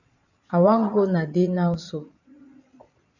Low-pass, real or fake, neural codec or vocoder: 7.2 kHz; fake; vocoder, 22.05 kHz, 80 mel bands, Vocos